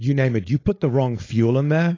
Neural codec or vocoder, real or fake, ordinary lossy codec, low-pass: codec, 16 kHz, 4.8 kbps, FACodec; fake; AAC, 32 kbps; 7.2 kHz